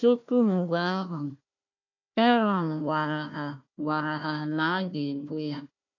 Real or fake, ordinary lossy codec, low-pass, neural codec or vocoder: fake; none; 7.2 kHz; codec, 16 kHz, 1 kbps, FunCodec, trained on Chinese and English, 50 frames a second